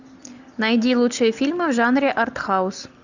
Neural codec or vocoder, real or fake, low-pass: none; real; 7.2 kHz